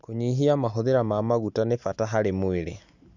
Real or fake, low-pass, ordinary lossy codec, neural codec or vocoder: real; 7.2 kHz; none; none